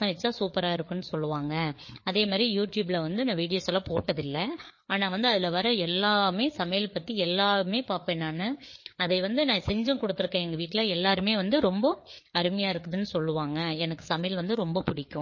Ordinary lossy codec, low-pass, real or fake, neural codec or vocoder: MP3, 32 kbps; 7.2 kHz; fake; codec, 16 kHz, 4 kbps, FreqCodec, larger model